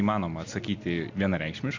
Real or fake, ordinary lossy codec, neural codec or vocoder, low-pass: real; MP3, 64 kbps; none; 7.2 kHz